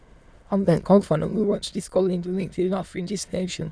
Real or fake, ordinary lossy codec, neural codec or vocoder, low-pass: fake; none; autoencoder, 22.05 kHz, a latent of 192 numbers a frame, VITS, trained on many speakers; none